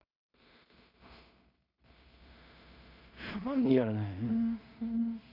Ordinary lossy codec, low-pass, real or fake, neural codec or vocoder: none; 5.4 kHz; fake; codec, 16 kHz in and 24 kHz out, 0.4 kbps, LongCat-Audio-Codec, two codebook decoder